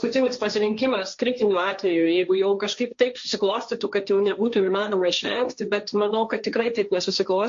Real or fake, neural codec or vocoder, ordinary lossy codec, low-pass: fake; codec, 16 kHz, 1.1 kbps, Voila-Tokenizer; MP3, 48 kbps; 7.2 kHz